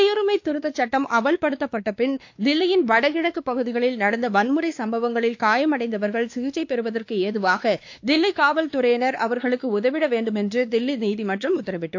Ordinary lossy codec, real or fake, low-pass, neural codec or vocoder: AAC, 48 kbps; fake; 7.2 kHz; codec, 16 kHz, 2 kbps, X-Codec, WavLM features, trained on Multilingual LibriSpeech